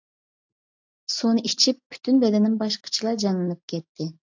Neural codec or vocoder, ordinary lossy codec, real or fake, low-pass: none; AAC, 48 kbps; real; 7.2 kHz